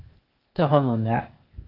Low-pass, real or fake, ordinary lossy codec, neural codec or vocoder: 5.4 kHz; fake; Opus, 32 kbps; codec, 16 kHz, 0.8 kbps, ZipCodec